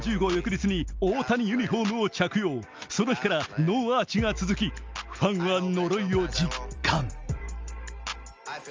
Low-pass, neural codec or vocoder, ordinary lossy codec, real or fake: 7.2 kHz; none; Opus, 24 kbps; real